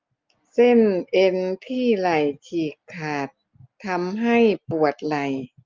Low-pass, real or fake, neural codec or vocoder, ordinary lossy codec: 7.2 kHz; real; none; Opus, 32 kbps